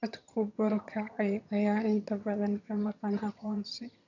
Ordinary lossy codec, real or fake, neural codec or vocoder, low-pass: none; fake; vocoder, 22.05 kHz, 80 mel bands, HiFi-GAN; 7.2 kHz